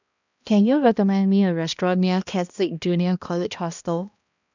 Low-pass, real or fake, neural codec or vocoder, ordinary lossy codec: 7.2 kHz; fake; codec, 16 kHz, 1 kbps, X-Codec, HuBERT features, trained on balanced general audio; none